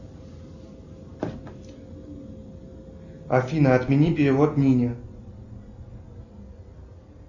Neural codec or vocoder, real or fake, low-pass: none; real; 7.2 kHz